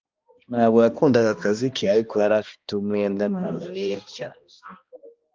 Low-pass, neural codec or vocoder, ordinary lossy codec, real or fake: 7.2 kHz; codec, 16 kHz, 1 kbps, X-Codec, HuBERT features, trained on balanced general audio; Opus, 24 kbps; fake